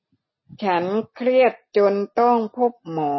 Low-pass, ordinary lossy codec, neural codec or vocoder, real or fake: 7.2 kHz; MP3, 24 kbps; none; real